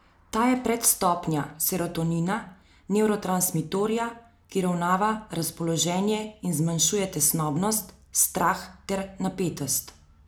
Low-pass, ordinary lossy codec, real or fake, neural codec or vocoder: none; none; real; none